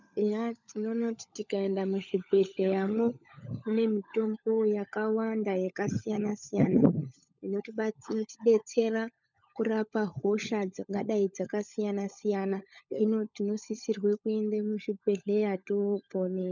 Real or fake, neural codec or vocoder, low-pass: fake; codec, 16 kHz, 16 kbps, FunCodec, trained on LibriTTS, 50 frames a second; 7.2 kHz